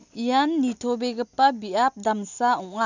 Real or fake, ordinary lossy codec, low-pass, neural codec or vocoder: real; none; 7.2 kHz; none